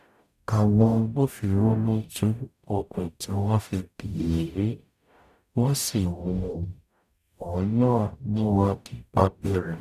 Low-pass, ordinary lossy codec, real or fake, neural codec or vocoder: 14.4 kHz; none; fake; codec, 44.1 kHz, 0.9 kbps, DAC